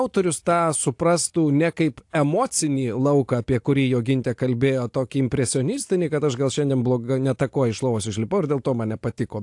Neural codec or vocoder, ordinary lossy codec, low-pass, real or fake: none; AAC, 64 kbps; 10.8 kHz; real